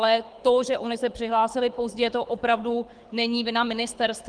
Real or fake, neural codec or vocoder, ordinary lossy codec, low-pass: fake; codec, 24 kHz, 6 kbps, HILCodec; Opus, 24 kbps; 9.9 kHz